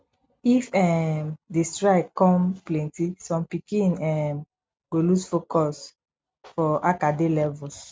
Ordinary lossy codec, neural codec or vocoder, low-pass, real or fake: none; none; none; real